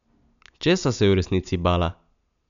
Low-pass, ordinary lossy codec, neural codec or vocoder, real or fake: 7.2 kHz; none; none; real